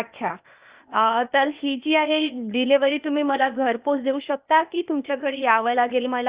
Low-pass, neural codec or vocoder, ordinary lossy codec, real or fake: 3.6 kHz; codec, 16 kHz, 0.8 kbps, ZipCodec; Opus, 64 kbps; fake